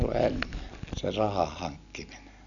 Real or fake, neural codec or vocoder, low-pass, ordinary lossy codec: real; none; 7.2 kHz; none